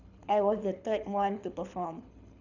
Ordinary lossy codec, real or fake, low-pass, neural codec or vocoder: none; fake; 7.2 kHz; codec, 24 kHz, 6 kbps, HILCodec